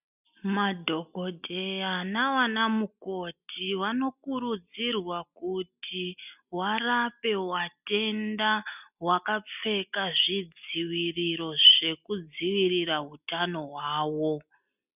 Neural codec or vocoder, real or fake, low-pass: none; real; 3.6 kHz